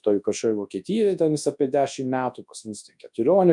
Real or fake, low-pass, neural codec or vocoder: fake; 10.8 kHz; codec, 24 kHz, 0.9 kbps, WavTokenizer, large speech release